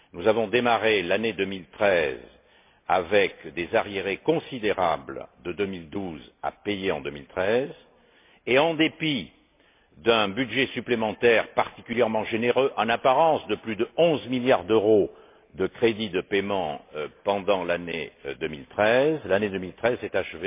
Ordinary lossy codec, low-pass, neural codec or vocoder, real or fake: MP3, 24 kbps; 3.6 kHz; none; real